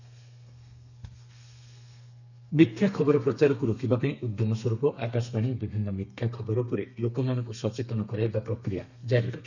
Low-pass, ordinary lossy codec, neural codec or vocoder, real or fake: 7.2 kHz; none; codec, 32 kHz, 1.9 kbps, SNAC; fake